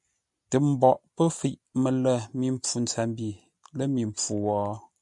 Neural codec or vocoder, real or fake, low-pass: none; real; 10.8 kHz